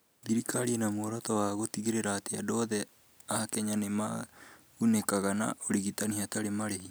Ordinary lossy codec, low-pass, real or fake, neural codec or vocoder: none; none; real; none